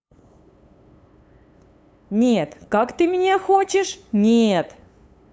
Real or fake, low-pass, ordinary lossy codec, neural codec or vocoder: fake; none; none; codec, 16 kHz, 8 kbps, FunCodec, trained on LibriTTS, 25 frames a second